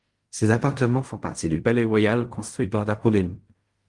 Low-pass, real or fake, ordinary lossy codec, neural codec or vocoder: 10.8 kHz; fake; Opus, 24 kbps; codec, 16 kHz in and 24 kHz out, 0.4 kbps, LongCat-Audio-Codec, fine tuned four codebook decoder